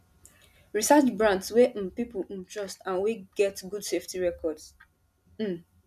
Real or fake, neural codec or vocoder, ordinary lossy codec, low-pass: real; none; none; 14.4 kHz